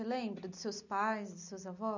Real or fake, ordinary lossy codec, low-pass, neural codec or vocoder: real; none; 7.2 kHz; none